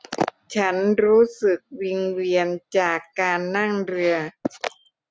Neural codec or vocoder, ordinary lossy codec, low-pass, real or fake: none; none; none; real